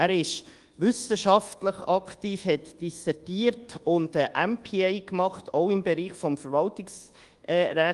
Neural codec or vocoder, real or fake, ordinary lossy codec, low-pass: codec, 24 kHz, 1.2 kbps, DualCodec; fake; Opus, 24 kbps; 10.8 kHz